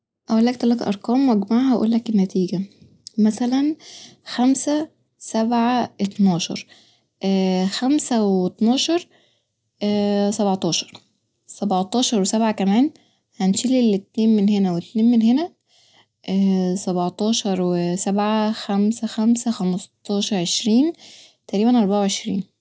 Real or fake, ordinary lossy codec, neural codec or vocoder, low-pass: real; none; none; none